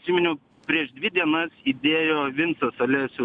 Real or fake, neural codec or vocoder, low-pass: real; none; 9.9 kHz